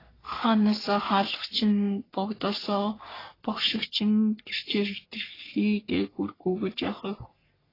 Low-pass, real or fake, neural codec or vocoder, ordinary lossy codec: 5.4 kHz; fake; codec, 44.1 kHz, 3.4 kbps, Pupu-Codec; AAC, 24 kbps